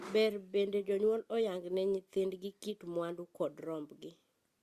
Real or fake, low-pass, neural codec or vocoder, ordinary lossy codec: real; 14.4 kHz; none; Opus, 64 kbps